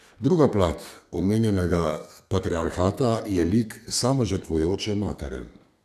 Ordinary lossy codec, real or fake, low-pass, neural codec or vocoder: none; fake; 14.4 kHz; codec, 32 kHz, 1.9 kbps, SNAC